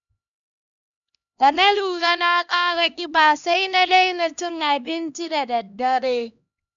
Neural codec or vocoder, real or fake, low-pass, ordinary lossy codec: codec, 16 kHz, 1 kbps, X-Codec, HuBERT features, trained on LibriSpeech; fake; 7.2 kHz; none